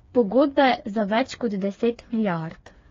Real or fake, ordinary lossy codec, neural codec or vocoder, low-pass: fake; AAC, 32 kbps; codec, 16 kHz, 4 kbps, FreqCodec, smaller model; 7.2 kHz